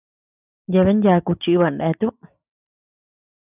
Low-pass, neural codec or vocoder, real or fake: 3.6 kHz; none; real